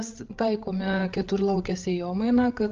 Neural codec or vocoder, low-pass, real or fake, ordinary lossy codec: codec, 16 kHz, 8 kbps, FreqCodec, larger model; 7.2 kHz; fake; Opus, 32 kbps